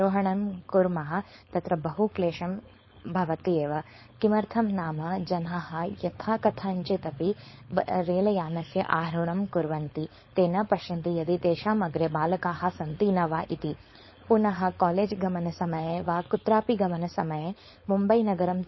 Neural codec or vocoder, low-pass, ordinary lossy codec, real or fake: codec, 16 kHz, 4.8 kbps, FACodec; 7.2 kHz; MP3, 24 kbps; fake